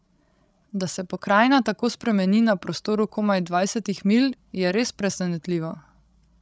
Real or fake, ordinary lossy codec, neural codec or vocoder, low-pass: fake; none; codec, 16 kHz, 16 kbps, FreqCodec, larger model; none